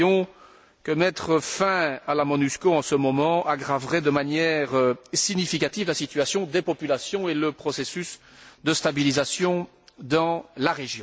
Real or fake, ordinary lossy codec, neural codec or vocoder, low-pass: real; none; none; none